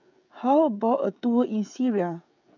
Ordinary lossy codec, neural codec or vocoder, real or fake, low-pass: none; codec, 16 kHz, 16 kbps, FreqCodec, smaller model; fake; 7.2 kHz